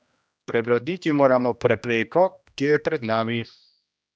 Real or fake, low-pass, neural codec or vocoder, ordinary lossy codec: fake; none; codec, 16 kHz, 1 kbps, X-Codec, HuBERT features, trained on general audio; none